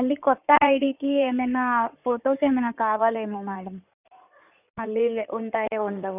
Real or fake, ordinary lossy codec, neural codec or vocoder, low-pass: fake; none; codec, 16 kHz in and 24 kHz out, 2.2 kbps, FireRedTTS-2 codec; 3.6 kHz